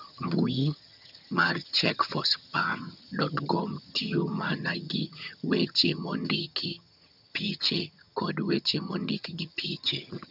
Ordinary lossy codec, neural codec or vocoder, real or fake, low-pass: none; vocoder, 22.05 kHz, 80 mel bands, HiFi-GAN; fake; 5.4 kHz